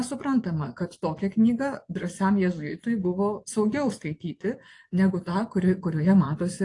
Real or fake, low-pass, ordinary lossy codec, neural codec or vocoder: fake; 10.8 kHz; AAC, 48 kbps; vocoder, 24 kHz, 100 mel bands, Vocos